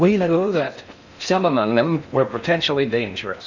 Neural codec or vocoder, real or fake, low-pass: codec, 16 kHz in and 24 kHz out, 0.6 kbps, FocalCodec, streaming, 4096 codes; fake; 7.2 kHz